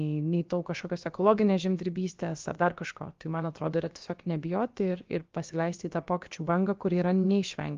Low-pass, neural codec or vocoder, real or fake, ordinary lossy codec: 7.2 kHz; codec, 16 kHz, about 1 kbps, DyCAST, with the encoder's durations; fake; Opus, 32 kbps